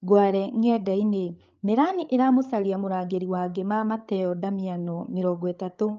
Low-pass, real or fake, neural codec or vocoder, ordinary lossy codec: 7.2 kHz; fake; codec, 16 kHz, 8 kbps, FreqCodec, larger model; Opus, 24 kbps